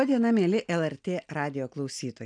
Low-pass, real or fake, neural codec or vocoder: 9.9 kHz; real; none